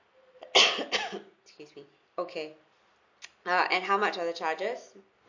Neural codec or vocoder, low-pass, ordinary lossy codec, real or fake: none; 7.2 kHz; MP3, 48 kbps; real